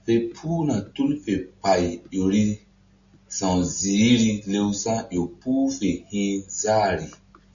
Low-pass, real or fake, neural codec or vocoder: 7.2 kHz; real; none